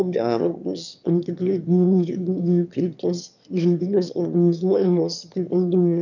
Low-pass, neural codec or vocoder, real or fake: 7.2 kHz; autoencoder, 22.05 kHz, a latent of 192 numbers a frame, VITS, trained on one speaker; fake